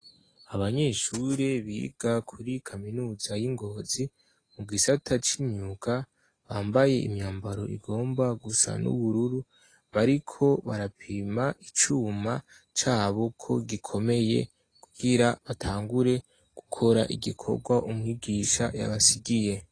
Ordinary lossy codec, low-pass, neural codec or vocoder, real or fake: AAC, 32 kbps; 9.9 kHz; none; real